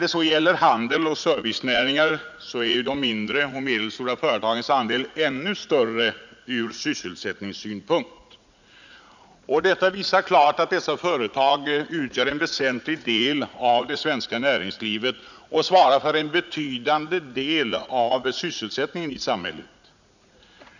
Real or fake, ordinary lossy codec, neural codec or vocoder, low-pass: fake; none; vocoder, 44.1 kHz, 80 mel bands, Vocos; 7.2 kHz